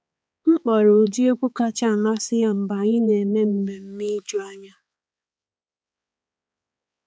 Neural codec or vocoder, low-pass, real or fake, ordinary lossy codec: codec, 16 kHz, 4 kbps, X-Codec, HuBERT features, trained on balanced general audio; none; fake; none